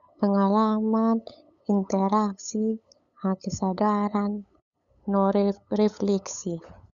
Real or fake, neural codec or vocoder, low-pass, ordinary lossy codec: fake; codec, 16 kHz, 8 kbps, FunCodec, trained on LibriTTS, 25 frames a second; 7.2 kHz; none